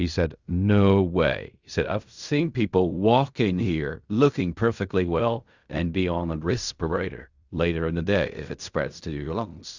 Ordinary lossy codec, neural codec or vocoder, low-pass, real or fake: Opus, 64 kbps; codec, 16 kHz in and 24 kHz out, 0.4 kbps, LongCat-Audio-Codec, fine tuned four codebook decoder; 7.2 kHz; fake